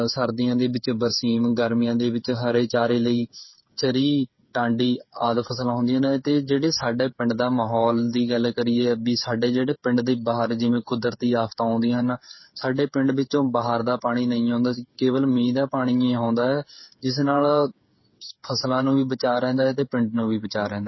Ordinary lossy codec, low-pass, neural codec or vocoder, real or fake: MP3, 24 kbps; 7.2 kHz; codec, 16 kHz, 8 kbps, FreqCodec, smaller model; fake